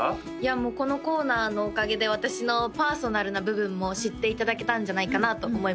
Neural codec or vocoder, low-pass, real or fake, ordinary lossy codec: none; none; real; none